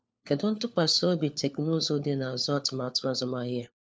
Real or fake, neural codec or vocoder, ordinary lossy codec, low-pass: fake; codec, 16 kHz, 4 kbps, FunCodec, trained on LibriTTS, 50 frames a second; none; none